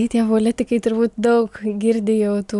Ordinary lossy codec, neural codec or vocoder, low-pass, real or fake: MP3, 96 kbps; none; 10.8 kHz; real